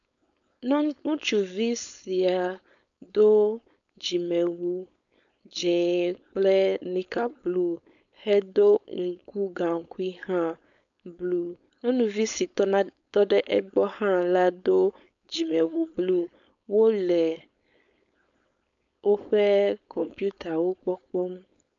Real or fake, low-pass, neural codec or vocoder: fake; 7.2 kHz; codec, 16 kHz, 4.8 kbps, FACodec